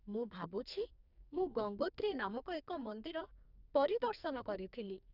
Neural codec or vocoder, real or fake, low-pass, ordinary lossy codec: codec, 44.1 kHz, 2.6 kbps, SNAC; fake; 5.4 kHz; none